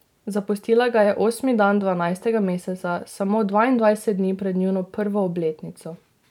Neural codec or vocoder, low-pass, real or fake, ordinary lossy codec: vocoder, 44.1 kHz, 128 mel bands every 512 samples, BigVGAN v2; 19.8 kHz; fake; none